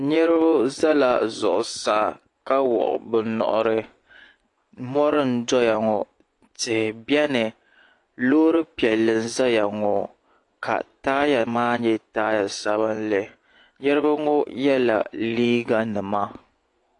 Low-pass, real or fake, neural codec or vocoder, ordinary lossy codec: 10.8 kHz; fake; vocoder, 24 kHz, 100 mel bands, Vocos; AAC, 48 kbps